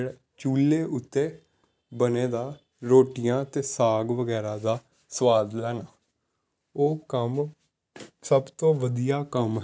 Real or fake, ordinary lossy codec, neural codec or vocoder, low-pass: real; none; none; none